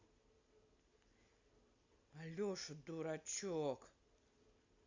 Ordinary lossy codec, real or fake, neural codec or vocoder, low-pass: Opus, 64 kbps; real; none; 7.2 kHz